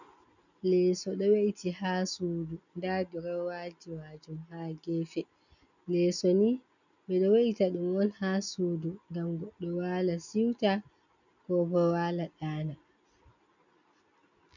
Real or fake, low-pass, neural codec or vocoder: real; 7.2 kHz; none